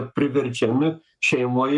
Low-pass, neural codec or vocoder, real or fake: 10.8 kHz; codec, 44.1 kHz, 7.8 kbps, Pupu-Codec; fake